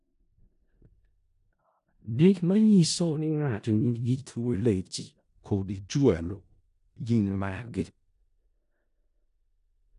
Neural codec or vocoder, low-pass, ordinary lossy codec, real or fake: codec, 16 kHz in and 24 kHz out, 0.4 kbps, LongCat-Audio-Codec, four codebook decoder; 10.8 kHz; AAC, 96 kbps; fake